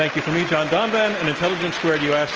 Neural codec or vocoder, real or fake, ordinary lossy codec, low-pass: none; real; Opus, 24 kbps; 7.2 kHz